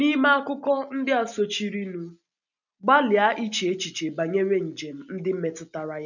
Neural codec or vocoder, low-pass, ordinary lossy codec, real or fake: none; 7.2 kHz; none; real